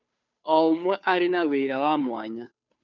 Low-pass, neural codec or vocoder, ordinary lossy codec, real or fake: 7.2 kHz; codec, 16 kHz, 2 kbps, FunCodec, trained on Chinese and English, 25 frames a second; none; fake